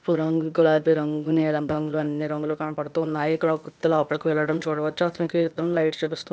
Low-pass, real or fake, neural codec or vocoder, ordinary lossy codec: none; fake; codec, 16 kHz, 0.8 kbps, ZipCodec; none